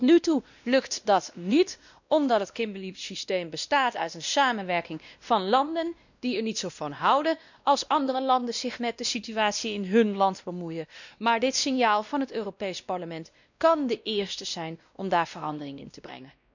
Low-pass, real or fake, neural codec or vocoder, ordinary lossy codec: 7.2 kHz; fake; codec, 16 kHz, 1 kbps, X-Codec, WavLM features, trained on Multilingual LibriSpeech; none